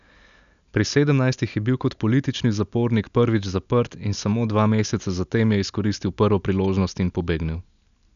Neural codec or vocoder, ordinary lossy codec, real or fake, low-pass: none; none; real; 7.2 kHz